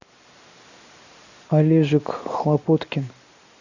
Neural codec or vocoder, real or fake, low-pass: none; real; 7.2 kHz